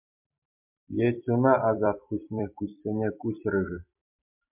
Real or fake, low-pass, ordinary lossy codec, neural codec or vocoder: fake; 3.6 kHz; MP3, 32 kbps; vocoder, 44.1 kHz, 128 mel bands every 256 samples, BigVGAN v2